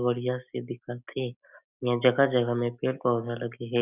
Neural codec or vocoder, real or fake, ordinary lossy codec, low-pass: none; real; none; 3.6 kHz